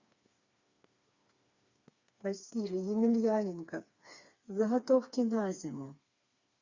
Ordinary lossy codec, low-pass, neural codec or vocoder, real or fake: Opus, 64 kbps; 7.2 kHz; codec, 16 kHz, 4 kbps, FreqCodec, smaller model; fake